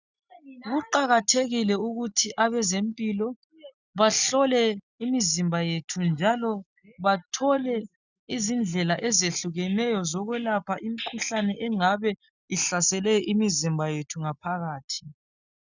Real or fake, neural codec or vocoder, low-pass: real; none; 7.2 kHz